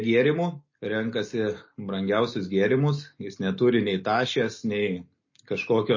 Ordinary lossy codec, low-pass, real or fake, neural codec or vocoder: MP3, 32 kbps; 7.2 kHz; real; none